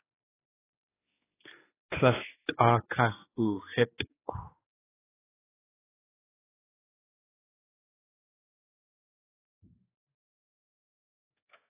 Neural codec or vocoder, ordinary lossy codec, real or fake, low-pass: codec, 16 kHz, 4 kbps, X-Codec, HuBERT features, trained on general audio; AAC, 16 kbps; fake; 3.6 kHz